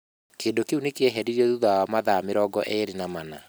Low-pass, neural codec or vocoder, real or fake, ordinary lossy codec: none; none; real; none